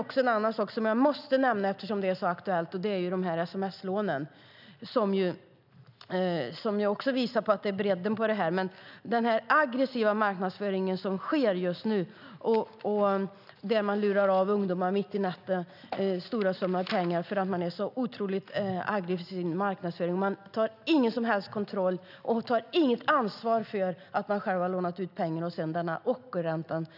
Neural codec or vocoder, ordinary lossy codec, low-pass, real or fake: none; none; 5.4 kHz; real